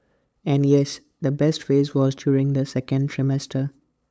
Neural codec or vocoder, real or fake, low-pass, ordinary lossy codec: codec, 16 kHz, 8 kbps, FunCodec, trained on LibriTTS, 25 frames a second; fake; none; none